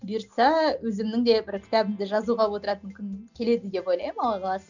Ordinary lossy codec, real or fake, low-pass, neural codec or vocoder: none; real; 7.2 kHz; none